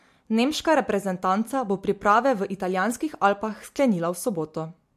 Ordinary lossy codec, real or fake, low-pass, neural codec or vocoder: MP3, 64 kbps; real; 14.4 kHz; none